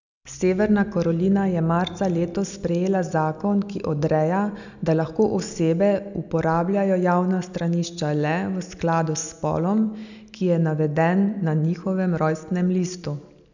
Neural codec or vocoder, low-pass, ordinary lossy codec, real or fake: none; 7.2 kHz; none; real